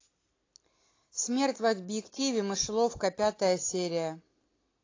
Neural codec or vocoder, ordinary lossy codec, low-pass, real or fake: none; AAC, 32 kbps; 7.2 kHz; real